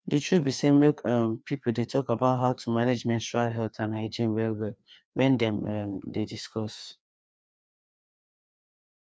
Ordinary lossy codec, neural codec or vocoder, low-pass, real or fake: none; codec, 16 kHz, 2 kbps, FreqCodec, larger model; none; fake